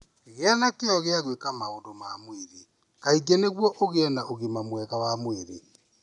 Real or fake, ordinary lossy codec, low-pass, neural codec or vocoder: real; none; 10.8 kHz; none